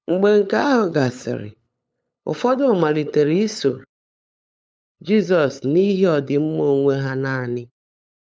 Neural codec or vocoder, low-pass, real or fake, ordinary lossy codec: codec, 16 kHz, 8 kbps, FunCodec, trained on LibriTTS, 25 frames a second; none; fake; none